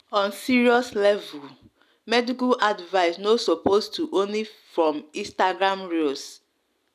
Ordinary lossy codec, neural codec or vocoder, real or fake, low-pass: none; none; real; 14.4 kHz